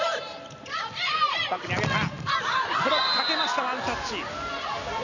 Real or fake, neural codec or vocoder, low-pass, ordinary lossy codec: real; none; 7.2 kHz; none